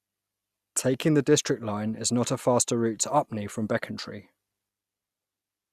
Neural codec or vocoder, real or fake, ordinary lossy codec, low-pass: none; real; Opus, 64 kbps; 14.4 kHz